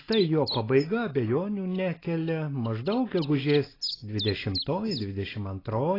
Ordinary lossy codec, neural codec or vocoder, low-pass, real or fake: AAC, 24 kbps; none; 5.4 kHz; real